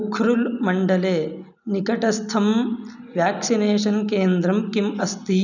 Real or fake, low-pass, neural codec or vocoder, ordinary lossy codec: real; 7.2 kHz; none; none